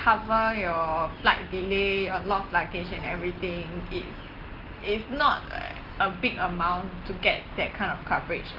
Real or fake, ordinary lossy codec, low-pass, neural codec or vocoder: real; Opus, 16 kbps; 5.4 kHz; none